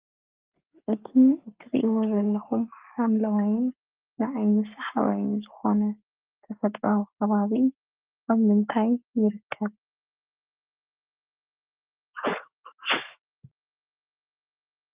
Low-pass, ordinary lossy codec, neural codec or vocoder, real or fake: 3.6 kHz; Opus, 32 kbps; codec, 44.1 kHz, 7.8 kbps, Pupu-Codec; fake